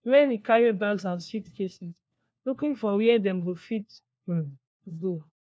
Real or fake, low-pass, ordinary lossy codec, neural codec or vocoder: fake; none; none; codec, 16 kHz, 1 kbps, FunCodec, trained on LibriTTS, 50 frames a second